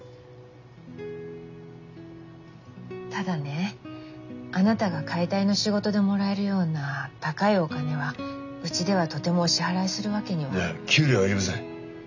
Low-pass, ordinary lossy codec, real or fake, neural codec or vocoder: 7.2 kHz; none; real; none